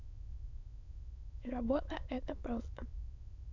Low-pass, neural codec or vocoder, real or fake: 7.2 kHz; autoencoder, 22.05 kHz, a latent of 192 numbers a frame, VITS, trained on many speakers; fake